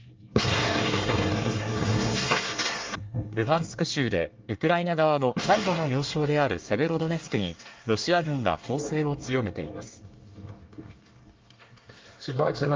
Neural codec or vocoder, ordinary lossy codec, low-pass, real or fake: codec, 24 kHz, 1 kbps, SNAC; Opus, 32 kbps; 7.2 kHz; fake